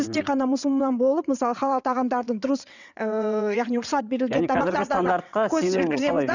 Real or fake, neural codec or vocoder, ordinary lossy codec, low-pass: fake; vocoder, 22.05 kHz, 80 mel bands, WaveNeXt; none; 7.2 kHz